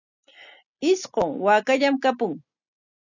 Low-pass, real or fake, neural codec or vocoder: 7.2 kHz; real; none